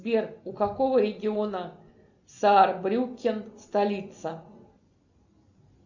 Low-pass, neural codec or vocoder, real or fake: 7.2 kHz; none; real